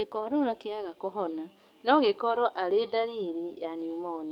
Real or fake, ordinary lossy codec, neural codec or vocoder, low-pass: fake; none; codec, 44.1 kHz, 7.8 kbps, DAC; 19.8 kHz